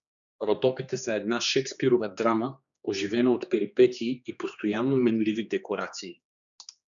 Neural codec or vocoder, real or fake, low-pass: codec, 16 kHz, 2 kbps, X-Codec, HuBERT features, trained on general audio; fake; 7.2 kHz